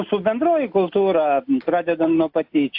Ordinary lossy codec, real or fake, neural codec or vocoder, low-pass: AAC, 48 kbps; real; none; 5.4 kHz